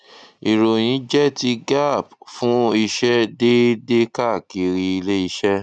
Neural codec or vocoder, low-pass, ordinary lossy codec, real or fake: none; 9.9 kHz; none; real